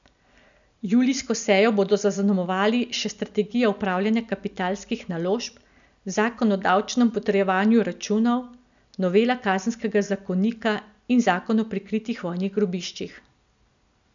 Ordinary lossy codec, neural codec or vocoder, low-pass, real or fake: none; none; 7.2 kHz; real